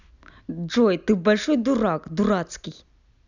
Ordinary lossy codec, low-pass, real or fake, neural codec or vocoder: none; 7.2 kHz; real; none